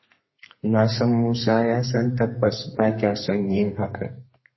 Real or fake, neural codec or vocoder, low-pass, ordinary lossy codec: fake; codec, 32 kHz, 1.9 kbps, SNAC; 7.2 kHz; MP3, 24 kbps